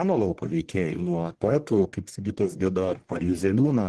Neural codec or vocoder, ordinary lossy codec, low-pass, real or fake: codec, 44.1 kHz, 1.7 kbps, Pupu-Codec; Opus, 16 kbps; 10.8 kHz; fake